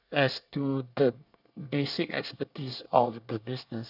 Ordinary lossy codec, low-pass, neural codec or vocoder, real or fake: none; 5.4 kHz; codec, 24 kHz, 1 kbps, SNAC; fake